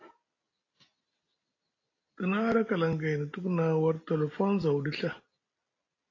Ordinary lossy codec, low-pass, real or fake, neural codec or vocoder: AAC, 32 kbps; 7.2 kHz; real; none